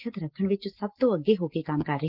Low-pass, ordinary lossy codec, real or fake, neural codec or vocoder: 5.4 kHz; Opus, 16 kbps; real; none